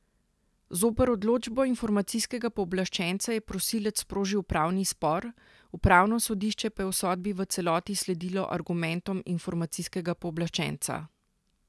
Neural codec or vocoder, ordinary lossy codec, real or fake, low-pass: none; none; real; none